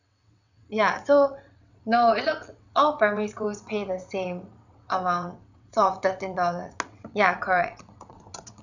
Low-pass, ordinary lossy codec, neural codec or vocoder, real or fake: 7.2 kHz; none; vocoder, 22.05 kHz, 80 mel bands, WaveNeXt; fake